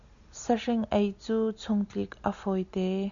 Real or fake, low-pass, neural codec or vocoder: real; 7.2 kHz; none